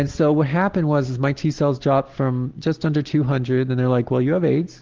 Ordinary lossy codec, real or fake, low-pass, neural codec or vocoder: Opus, 16 kbps; real; 7.2 kHz; none